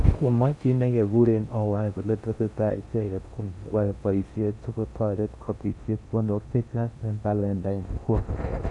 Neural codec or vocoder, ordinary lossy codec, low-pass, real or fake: codec, 16 kHz in and 24 kHz out, 0.6 kbps, FocalCodec, streaming, 4096 codes; none; 10.8 kHz; fake